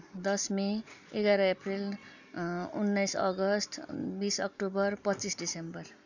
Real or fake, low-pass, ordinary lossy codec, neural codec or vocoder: real; 7.2 kHz; none; none